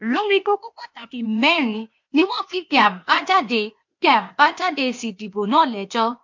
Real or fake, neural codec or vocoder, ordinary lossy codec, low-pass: fake; codec, 16 kHz, 0.8 kbps, ZipCodec; MP3, 48 kbps; 7.2 kHz